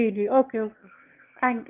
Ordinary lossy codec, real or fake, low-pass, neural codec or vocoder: Opus, 32 kbps; fake; 3.6 kHz; autoencoder, 22.05 kHz, a latent of 192 numbers a frame, VITS, trained on one speaker